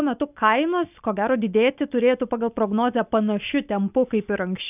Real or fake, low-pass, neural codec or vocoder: real; 3.6 kHz; none